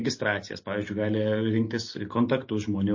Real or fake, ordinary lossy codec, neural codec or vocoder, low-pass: real; MP3, 32 kbps; none; 7.2 kHz